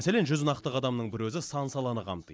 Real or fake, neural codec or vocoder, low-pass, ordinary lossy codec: real; none; none; none